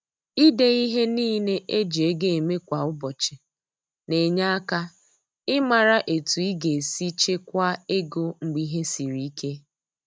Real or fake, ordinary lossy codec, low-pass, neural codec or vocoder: real; none; none; none